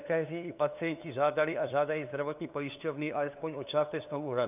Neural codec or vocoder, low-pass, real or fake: codec, 16 kHz, 2 kbps, FunCodec, trained on LibriTTS, 25 frames a second; 3.6 kHz; fake